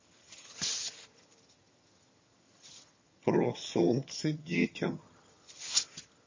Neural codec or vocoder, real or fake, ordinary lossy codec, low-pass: vocoder, 22.05 kHz, 80 mel bands, HiFi-GAN; fake; MP3, 32 kbps; 7.2 kHz